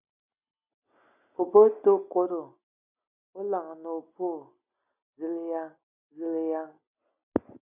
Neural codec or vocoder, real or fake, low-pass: none; real; 3.6 kHz